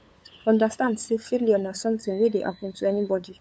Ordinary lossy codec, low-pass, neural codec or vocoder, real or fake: none; none; codec, 16 kHz, 8 kbps, FunCodec, trained on LibriTTS, 25 frames a second; fake